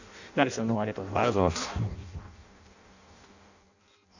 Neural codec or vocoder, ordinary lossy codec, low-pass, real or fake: codec, 16 kHz in and 24 kHz out, 0.6 kbps, FireRedTTS-2 codec; none; 7.2 kHz; fake